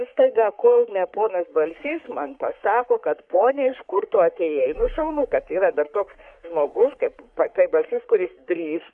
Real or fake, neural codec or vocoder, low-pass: fake; codec, 44.1 kHz, 3.4 kbps, Pupu-Codec; 10.8 kHz